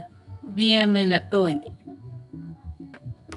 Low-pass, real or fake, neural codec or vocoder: 10.8 kHz; fake; codec, 24 kHz, 0.9 kbps, WavTokenizer, medium music audio release